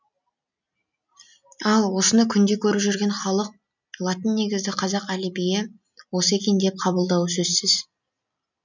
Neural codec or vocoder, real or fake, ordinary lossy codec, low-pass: none; real; none; 7.2 kHz